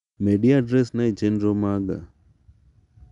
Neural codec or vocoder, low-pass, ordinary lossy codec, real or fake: none; 10.8 kHz; none; real